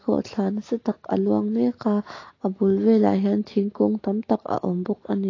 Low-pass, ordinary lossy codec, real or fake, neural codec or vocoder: 7.2 kHz; AAC, 32 kbps; real; none